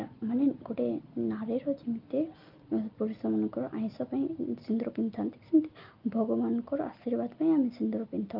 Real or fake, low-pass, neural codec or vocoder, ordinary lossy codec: real; 5.4 kHz; none; Opus, 24 kbps